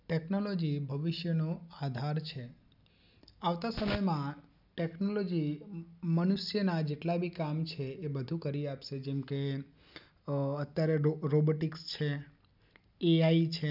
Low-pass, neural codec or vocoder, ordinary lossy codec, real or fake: 5.4 kHz; none; none; real